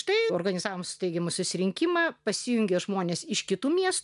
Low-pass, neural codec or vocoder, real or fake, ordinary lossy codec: 10.8 kHz; none; real; MP3, 96 kbps